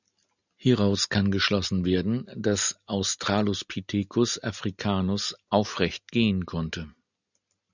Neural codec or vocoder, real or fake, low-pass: none; real; 7.2 kHz